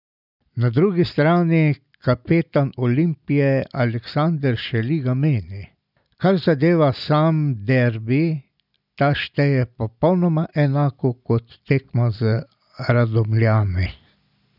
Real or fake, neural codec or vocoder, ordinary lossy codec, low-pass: real; none; none; 5.4 kHz